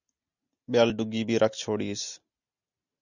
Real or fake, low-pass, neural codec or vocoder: real; 7.2 kHz; none